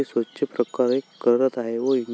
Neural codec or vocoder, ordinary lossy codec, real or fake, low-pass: none; none; real; none